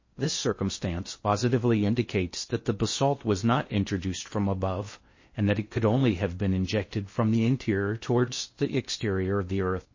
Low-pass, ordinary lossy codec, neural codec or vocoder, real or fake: 7.2 kHz; MP3, 32 kbps; codec, 16 kHz in and 24 kHz out, 0.6 kbps, FocalCodec, streaming, 4096 codes; fake